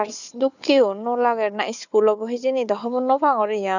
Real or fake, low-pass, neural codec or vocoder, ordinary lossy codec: fake; 7.2 kHz; codec, 16 kHz, 4 kbps, FunCodec, trained on Chinese and English, 50 frames a second; none